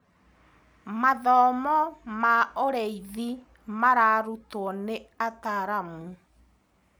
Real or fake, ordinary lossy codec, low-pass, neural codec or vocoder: real; none; none; none